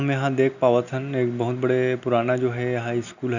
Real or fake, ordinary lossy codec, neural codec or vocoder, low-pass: real; none; none; 7.2 kHz